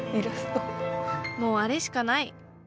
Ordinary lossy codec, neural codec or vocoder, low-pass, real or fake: none; none; none; real